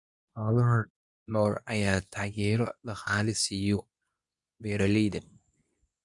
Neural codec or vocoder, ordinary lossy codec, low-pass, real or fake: codec, 24 kHz, 0.9 kbps, WavTokenizer, medium speech release version 2; none; 10.8 kHz; fake